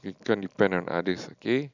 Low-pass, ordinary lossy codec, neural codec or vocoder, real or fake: 7.2 kHz; none; none; real